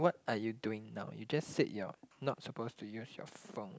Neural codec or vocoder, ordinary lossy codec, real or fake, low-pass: none; none; real; none